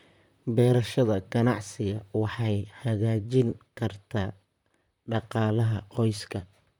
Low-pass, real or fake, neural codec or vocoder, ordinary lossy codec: 19.8 kHz; fake; vocoder, 44.1 kHz, 128 mel bands, Pupu-Vocoder; MP3, 96 kbps